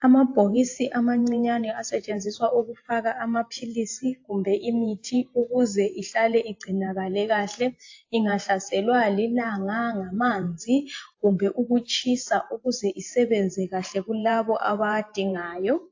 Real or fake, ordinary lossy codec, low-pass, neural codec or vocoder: fake; AAC, 48 kbps; 7.2 kHz; vocoder, 44.1 kHz, 128 mel bands every 512 samples, BigVGAN v2